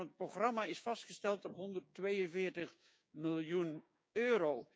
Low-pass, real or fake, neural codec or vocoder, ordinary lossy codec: none; fake; codec, 16 kHz, 6 kbps, DAC; none